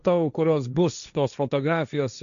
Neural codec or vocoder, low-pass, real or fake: codec, 16 kHz, 1.1 kbps, Voila-Tokenizer; 7.2 kHz; fake